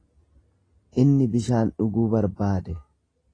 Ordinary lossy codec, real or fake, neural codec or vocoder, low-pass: AAC, 32 kbps; real; none; 9.9 kHz